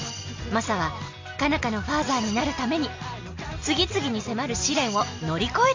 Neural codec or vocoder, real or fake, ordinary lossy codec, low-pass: none; real; MP3, 48 kbps; 7.2 kHz